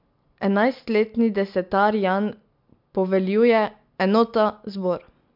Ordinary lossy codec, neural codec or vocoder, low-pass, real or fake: MP3, 48 kbps; none; 5.4 kHz; real